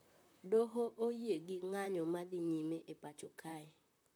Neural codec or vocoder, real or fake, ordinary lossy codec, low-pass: vocoder, 44.1 kHz, 128 mel bands, Pupu-Vocoder; fake; none; none